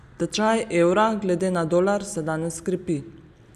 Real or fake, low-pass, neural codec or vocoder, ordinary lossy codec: real; 14.4 kHz; none; none